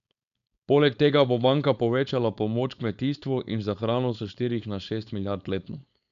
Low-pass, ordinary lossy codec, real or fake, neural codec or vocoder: 7.2 kHz; none; fake; codec, 16 kHz, 4.8 kbps, FACodec